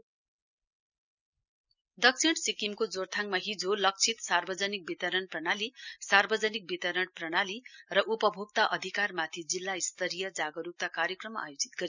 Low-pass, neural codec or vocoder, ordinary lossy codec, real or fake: 7.2 kHz; none; none; real